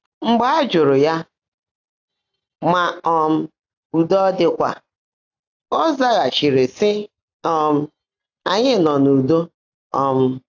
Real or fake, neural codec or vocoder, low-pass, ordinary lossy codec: real; none; 7.2 kHz; AAC, 48 kbps